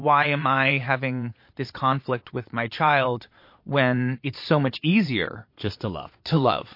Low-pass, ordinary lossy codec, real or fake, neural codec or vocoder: 5.4 kHz; MP3, 32 kbps; fake; vocoder, 22.05 kHz, 80 mel bands, Vocos